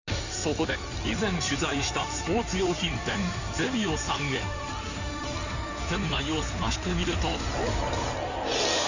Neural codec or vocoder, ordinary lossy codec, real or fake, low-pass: codec, 16 kHz in and 24 kHz out, 2.2 kbps, FireRedTTS-2 codec; none; fake; 7.2 kHz